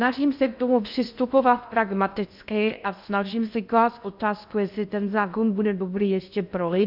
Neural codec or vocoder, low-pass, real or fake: codec, 16 kHz in and 24 kHz out, 0.6 kbps, FocalCodec, streaming, 2048 codes; 5.4 kHz; fake